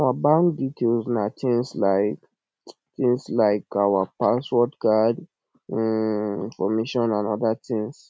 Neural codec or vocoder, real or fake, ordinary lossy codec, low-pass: none; real; none; none